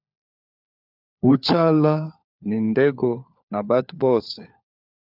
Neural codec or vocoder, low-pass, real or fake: codec, 16 kHz, 4 kbps, FunCodec, trained on LibriTTS, 50 frames a second; 5.4 kHz; fake